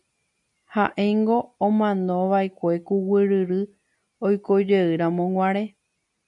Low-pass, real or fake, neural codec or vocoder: 10.8 kHz; real; none